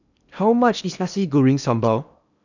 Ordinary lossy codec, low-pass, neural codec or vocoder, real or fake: none; 7.2 kHz; codec, 16 kHz in and 24 kHz out, 0.8 kbps, FocalCodec, streaming, 65536 codes; fake